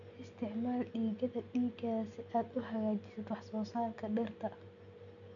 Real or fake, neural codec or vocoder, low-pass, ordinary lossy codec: real; none; 7.2 kHz; none